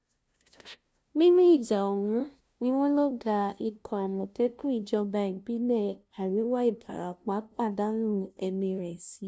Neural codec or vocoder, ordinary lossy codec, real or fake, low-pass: codec, 16 kHz, 0.5 kbps, FunCodec, trained on LibriTTS, 25 frames a second; none; fake; none